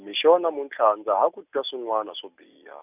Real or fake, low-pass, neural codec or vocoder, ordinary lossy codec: real; 3.6 kHz; none; none